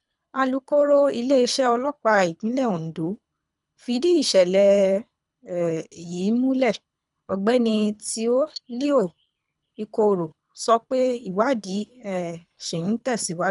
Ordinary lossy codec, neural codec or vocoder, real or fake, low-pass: none; codec, 24 kHz, 3 kbps, HILCodec; fake; 10.8 kHz